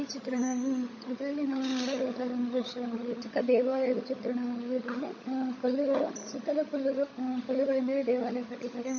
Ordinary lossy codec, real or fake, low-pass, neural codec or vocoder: MP3, 32 kbps; fake; 7.2 kHz; codec, 16 kHz, 16 kbps, FunCodec, trained on LibriTTS, 50 frames a second